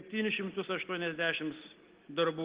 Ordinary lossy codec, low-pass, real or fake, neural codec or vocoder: Opus, 16 kbps; 3.6 kHz; real; none